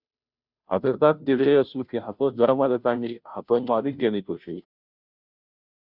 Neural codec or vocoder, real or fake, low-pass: codec, 16 kHz, 0.5 kbps, FunCodec, trained on Chinese and English, 25 frames a second; fake; 5.4 kHz